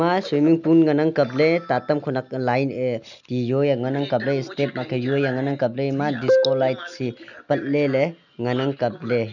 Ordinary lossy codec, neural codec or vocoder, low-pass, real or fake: none; none; 7.2 kHz; real